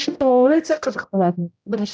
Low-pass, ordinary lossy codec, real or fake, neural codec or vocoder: none; none; fake; codec, 16 kHz, 0.5 kbps, X-Codec, HuBERT features, trained on general audio